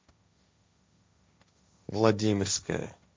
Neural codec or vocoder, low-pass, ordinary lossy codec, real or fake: codec, 16 kHz, 1.1 kbps, Voila-Tokenizer; none; none; fake